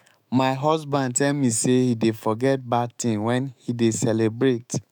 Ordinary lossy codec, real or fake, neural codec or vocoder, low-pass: none; fake; autoencoder, 48 kHz, 128 numbers a frame, DAC-VAE, trained on Japanese speech; none